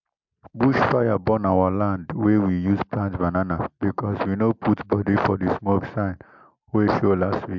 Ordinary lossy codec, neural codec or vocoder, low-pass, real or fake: MP3, 64 kbps; none; 7.2 kHz; real